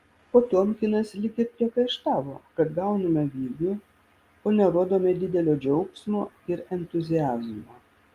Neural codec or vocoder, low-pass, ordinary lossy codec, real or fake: none; 14.4 kHz; Opus, 32 kbps; real